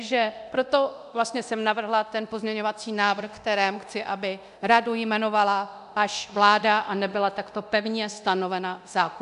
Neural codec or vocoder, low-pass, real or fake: codec, 24 kHz, 0.9 kbps, DualCodec; 10.8 kHz; fake